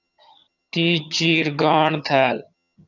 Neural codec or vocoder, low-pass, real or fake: vocoder, 22.05 kHz, 80 mel bands, HiFi-GAN; 7.2 kHz; fake